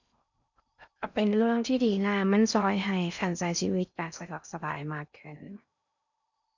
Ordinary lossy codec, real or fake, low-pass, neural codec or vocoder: none; fake; 7.2 kHz; codec, 16 kHz in and 24 kHz out, 0.6 kbps, FocalCodec, streaming, 4096 codes